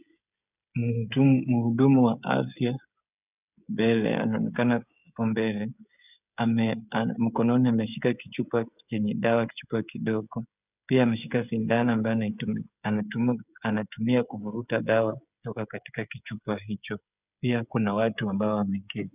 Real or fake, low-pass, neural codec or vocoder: fake; 3.6 kHz; codec, 16 kHz, 16 kbps, FreqCodec, smaller model